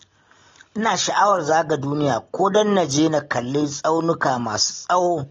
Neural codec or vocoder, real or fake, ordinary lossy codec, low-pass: none; real; AAC, 32 kbps; 7.2 kHz